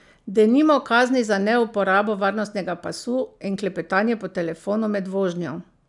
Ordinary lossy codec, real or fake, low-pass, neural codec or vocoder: none; real; 10.8 kHz; none